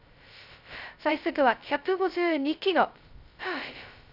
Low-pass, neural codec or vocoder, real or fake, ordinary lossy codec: 5.4 kHz; codec, 16 kHz, 0.2 kbps, FocalCodec; fake; none